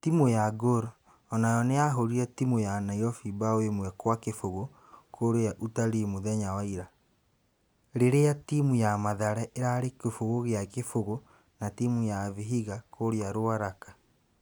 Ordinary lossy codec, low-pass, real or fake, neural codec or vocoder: none; none; real; none